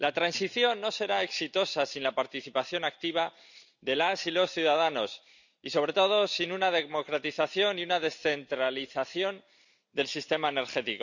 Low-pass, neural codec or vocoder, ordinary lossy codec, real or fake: 7.2 kHz; none; none; real